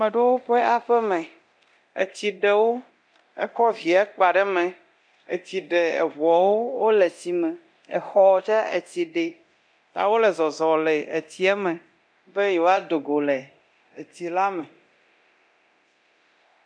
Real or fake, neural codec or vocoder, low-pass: fake; codec, 24 kHz, 0.9 kbps, DualCodec; 9.9 kHz